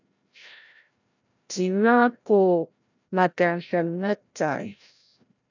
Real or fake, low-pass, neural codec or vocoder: fake; 7.2 kHz; codec, 16 kHz, 0.5 kbps, FreqCodec, larger model